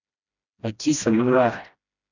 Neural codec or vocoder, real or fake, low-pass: codec, 16 kHz, 1 kbps, FreqCodec, smaller model; fake; 7.2 kHz